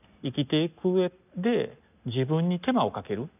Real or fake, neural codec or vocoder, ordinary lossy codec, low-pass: real; none; none; 3.6 kHz